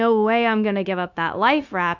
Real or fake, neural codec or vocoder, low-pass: fake; codec, 16 kHz, 0.9 kbps, LongCat-Audio-Codec; 7.2 kHz